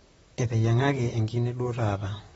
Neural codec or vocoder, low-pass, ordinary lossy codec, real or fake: vocoder, 44.1 kHz, 128 mel bands, Pupu-Vocoder; 19.8 kHz; AAC, 24 kbps; fake